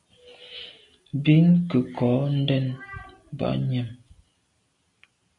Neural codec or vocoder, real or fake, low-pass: none; real; 10.8 kHz